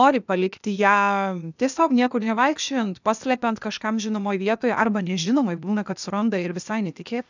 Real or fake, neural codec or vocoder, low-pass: fake; codec, 16 kHz, 0.8 kbps, ZipCodec; 7.2 kHz